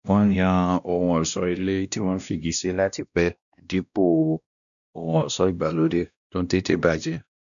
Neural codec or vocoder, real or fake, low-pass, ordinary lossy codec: codec, 16 kHz, 1 kbps, X-Codec, WavLM features, trained on Multilingual LibriSpeech; fake; 7.2 kHz; none